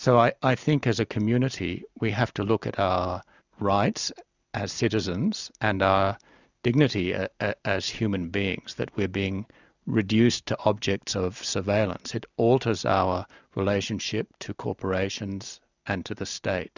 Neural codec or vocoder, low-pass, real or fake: none; 7.2 kHz; real